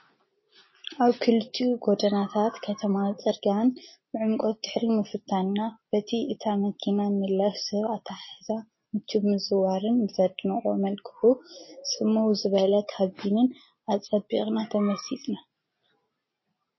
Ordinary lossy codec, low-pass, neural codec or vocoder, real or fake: MP3, 24 kbps; 7.2 kHz; none; real